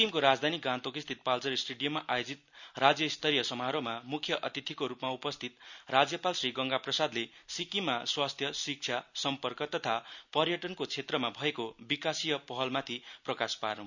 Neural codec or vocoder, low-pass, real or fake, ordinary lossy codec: none; 7.2 kHz; real; none